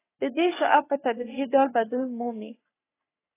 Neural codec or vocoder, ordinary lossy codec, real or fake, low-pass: codec, 44.1 kHz, 3.4 kbps, Pupu-Codec; AAC, 16 kbps; fake; 3.6 kHz